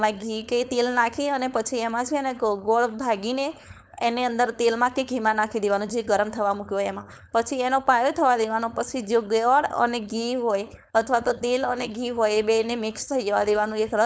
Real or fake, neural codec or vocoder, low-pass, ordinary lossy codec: fake; codec, 16 kHz, 4.8 kbps, FACodec; none; none